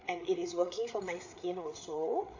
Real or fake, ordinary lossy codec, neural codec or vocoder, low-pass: fake; none; codec, 16 kHz, 8 kbps, FreqCodec, larger model; 7.2 kHz